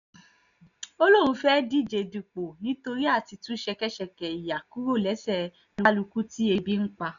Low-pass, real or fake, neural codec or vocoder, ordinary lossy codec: 7.2 kHz; real; none; none